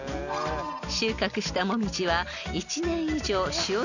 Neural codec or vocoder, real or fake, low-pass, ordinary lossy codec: none; real; 7.2 kHz; none